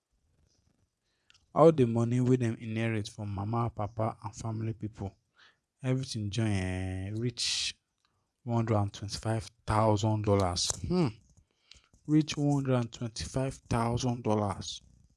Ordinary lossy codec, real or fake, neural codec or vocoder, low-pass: none; fake; vocoder, 24 kHz, 100 mel bands, Vocos; none